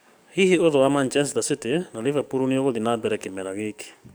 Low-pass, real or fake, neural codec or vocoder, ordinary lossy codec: none; fake; codec, 44.1 kHz, 7.8 kbps, DAC; none